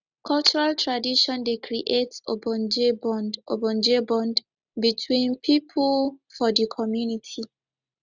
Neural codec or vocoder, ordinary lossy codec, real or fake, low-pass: none; none; real; 7.2 kHz